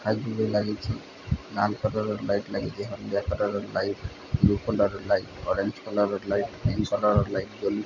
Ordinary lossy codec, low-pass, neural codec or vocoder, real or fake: none; 7.2 kHz; none; real